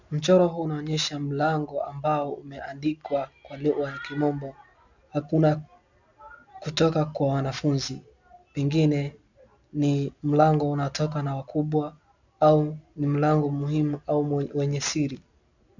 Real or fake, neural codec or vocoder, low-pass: real; none; 7.2 kHz